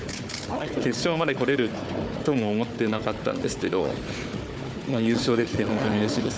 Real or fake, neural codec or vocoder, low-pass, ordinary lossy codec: fake; codec, 16 kHz, 4 kbps, FunCodec, trained on Chinese and English, 50 frames a second; none; none